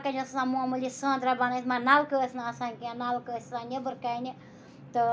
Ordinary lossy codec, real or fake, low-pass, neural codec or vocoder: none; real; none; none